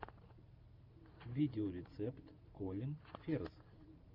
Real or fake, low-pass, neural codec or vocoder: fake; 5.4 kHz; vocoder, 44.1 kHz, 128 mel bands every 512 samples, BigVGAN v2